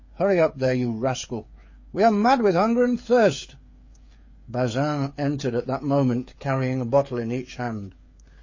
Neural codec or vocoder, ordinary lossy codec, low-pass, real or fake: codec, 16 kHz, 8 kbps, FreqCodec, smaller model; MP3, 32 kbps; 7.2 kHz; fake